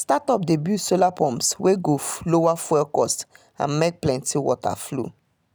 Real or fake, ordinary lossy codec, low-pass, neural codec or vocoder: real; none; none; none